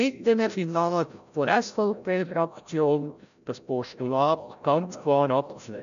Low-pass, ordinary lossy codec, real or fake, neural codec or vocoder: 7.2 kHz; none; fake; codec, 16 kHz, 0.5 kbps, FreqCodec, larger model